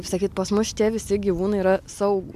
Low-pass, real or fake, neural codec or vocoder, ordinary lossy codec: 14.4 kHz; real; none; MP3, 96 kbps